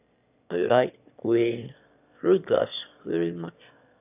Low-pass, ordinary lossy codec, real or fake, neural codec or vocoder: 3.6 kHz; none; fake; autoencoder, 22.05 kHz, a latent of 192 numbers a frame, VITS, trained on one speaker